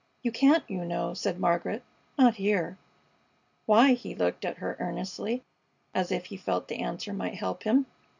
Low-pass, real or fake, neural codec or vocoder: 7.2 kHz; real; none